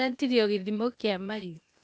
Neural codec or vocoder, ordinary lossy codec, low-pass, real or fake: codec, 16 kHz, 0.8 kbps, ZipCodec; none; none; fake